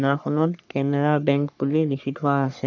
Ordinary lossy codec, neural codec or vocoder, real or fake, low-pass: none; codec, 44.1 kHz, 3.4 kbps, Pupu-Codec; fake; 7.2 kHz